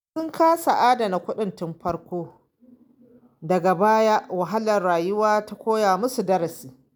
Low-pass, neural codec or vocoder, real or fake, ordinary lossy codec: none; none; real; none